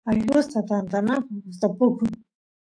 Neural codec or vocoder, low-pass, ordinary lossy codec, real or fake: autoencoder, 48 kHz, 128 numbers a frame, DAC-VAE, trained on Japanese speech; 9.9 kHz; AAC, 64 kbps; fake